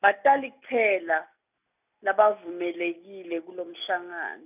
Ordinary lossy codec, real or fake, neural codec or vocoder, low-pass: none; real; none; 3.6 kHz